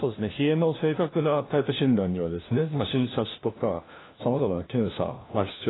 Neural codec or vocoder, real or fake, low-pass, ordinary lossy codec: codec, 16 kHz, 1 kbps, FunCodec, trained on LibriTTS, 50 frames a second; fake; 7.2 kHz; AAC, 16 kbps